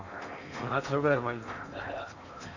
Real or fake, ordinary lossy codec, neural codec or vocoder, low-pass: fake; none; codec, 16 kHz in and 24 kHz out, 0.8 kbps, FocalCodec, streaming, 65536 codes; 7.2 kHz